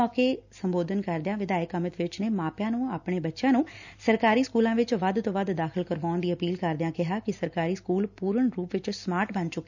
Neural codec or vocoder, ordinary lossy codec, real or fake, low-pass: none; none; real; 7.2 kHz